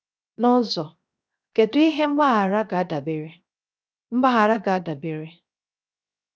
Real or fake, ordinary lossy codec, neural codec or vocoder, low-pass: fake; none; codec, 16 kHz, 0.7 kbps, FocalCodec; none